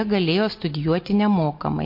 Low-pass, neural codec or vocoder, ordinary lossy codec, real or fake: 5.4 kHz; none; MP3, 32 kbps; real